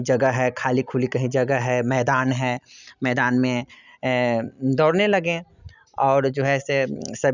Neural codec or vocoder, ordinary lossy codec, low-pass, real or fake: none; none; 7.2 kHz; real